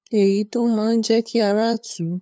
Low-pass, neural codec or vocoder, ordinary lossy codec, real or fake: none; codec, 16 kHz, 2 kbps, FunCodec, trained on LibriTTS, 25 frames a second; none; fake